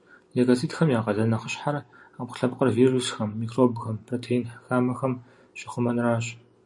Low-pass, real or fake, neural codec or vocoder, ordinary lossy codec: 10.8 kHz; real; none; MP3, 64 kbps